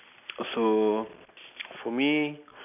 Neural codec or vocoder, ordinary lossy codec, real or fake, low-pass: none; none; real; 3.6 kHz